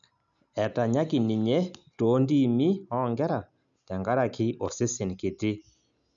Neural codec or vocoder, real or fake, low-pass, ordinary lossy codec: none; real; 7.2 kHz; none